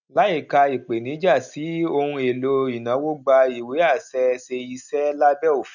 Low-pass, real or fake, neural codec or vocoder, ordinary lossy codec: 7.2 kHz; real; none; none